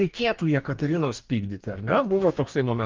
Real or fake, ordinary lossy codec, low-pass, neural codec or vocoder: fake; Opus, 24 kbps; 7.2 kHz; codec, 44.1 kHz, 2.6 kbps, DAC